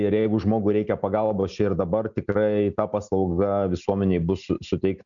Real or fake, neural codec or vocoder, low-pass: real; none; 7.2 kHz